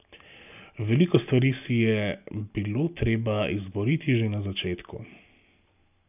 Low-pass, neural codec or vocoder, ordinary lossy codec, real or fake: 3.6 kHz; none; none; real